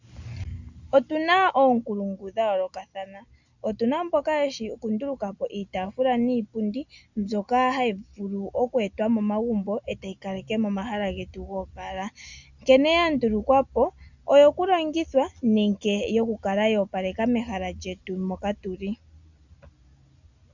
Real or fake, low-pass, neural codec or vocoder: real; 7.2 kHz; none